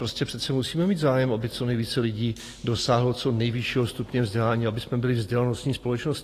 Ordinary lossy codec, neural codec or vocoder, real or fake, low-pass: AAC, 48 kbps; none; real; 14.4 kHz